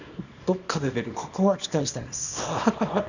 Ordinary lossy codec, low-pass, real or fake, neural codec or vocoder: none; 7.2 kHz; fake; codec, 24 kHz, 0.9 kbps, WavTokenizer, small release